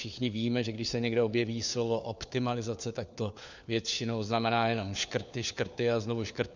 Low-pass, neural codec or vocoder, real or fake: 7.2 kHz; codec, 16 kHz, 4 kbps, FunCodec, trained on LibriTTS, 50 frames a second; fake